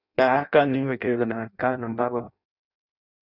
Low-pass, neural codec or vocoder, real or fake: 5.4 kHz; codec, 16 kHz in and 24 kHz out, 0.6 kbps, FireRedTTS-2 codec; fake